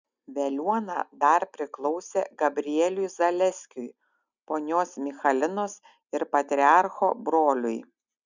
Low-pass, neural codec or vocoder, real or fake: 7.2 kHz; none; real